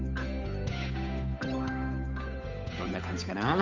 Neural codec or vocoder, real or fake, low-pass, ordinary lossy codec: codec, 16 kHz, 8 kbps, FunCodec, trained on Chinese and English, 25 frames a second; fake; 7.2 kHz; none